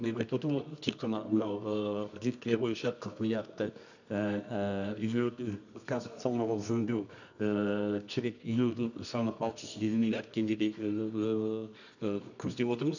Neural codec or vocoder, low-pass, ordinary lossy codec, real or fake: codec, 24 kHz, 0.9 kbps, WavTokenizer, medium music audio release; 7.2 kHz; none; fake